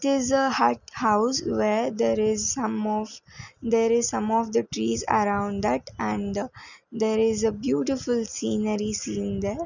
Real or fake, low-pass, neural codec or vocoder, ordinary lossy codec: real; 7.2 kHz; none; none